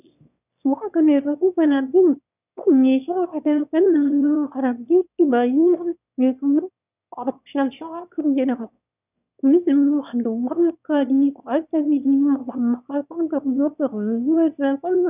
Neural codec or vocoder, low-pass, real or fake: autoencoder, 22.05 kHz, a latent of 192 numbers a frame, VITS, trained on one speaker; 3.6 kHz; fake